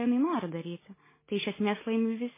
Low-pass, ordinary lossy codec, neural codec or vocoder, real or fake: 3.6 kHz; MP3, 16 kbps; none; real